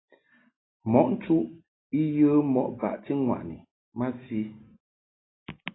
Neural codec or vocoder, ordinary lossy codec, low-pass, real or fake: none; AAC, 16 kbps; 7.2 kHz; real